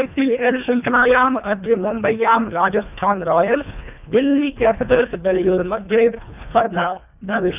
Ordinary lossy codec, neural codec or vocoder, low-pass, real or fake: none; codec, 24 kHz, 1.5 kbps, HILCodec; 3.6 kHz; fake